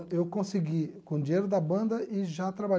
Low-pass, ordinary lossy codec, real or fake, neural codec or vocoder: none; none; real; none